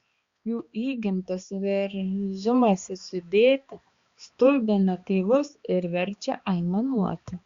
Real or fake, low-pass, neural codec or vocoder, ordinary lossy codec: fake; 7.2 kHz; codec, 16 kHz, 2 kbps, X-Codec, HuBERT features, trained on balanced general audio; Opus, 64 kbps